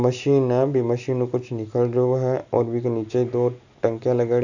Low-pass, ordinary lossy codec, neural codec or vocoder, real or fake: 7.2 kHz; none; none; real